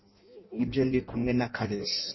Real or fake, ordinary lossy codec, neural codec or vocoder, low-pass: fake; MP3, 24 kbps; codec, 16 kHz in and 24 kHz out, 0.6 kbps, FireRedTTS-2 codec; 7.2 kHz